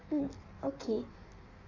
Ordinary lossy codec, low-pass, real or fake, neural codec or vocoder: none; 7.2 kHz; fake; codec, 16 kHz in and 24 kHz out, 1.1 kbps, FireRedTTS-2 codec